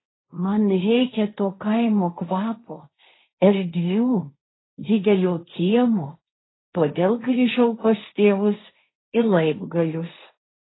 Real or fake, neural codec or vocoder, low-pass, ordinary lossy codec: fake; codec, 16 kHz, 1.1 kbps, Voila-Tokenizer; 7.2 kHz; AAC, 16 kbps